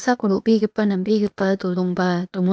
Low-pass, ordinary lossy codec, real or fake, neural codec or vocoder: none; none; fake; codec, 16 kHz, 0.8 kbps, ZipCodec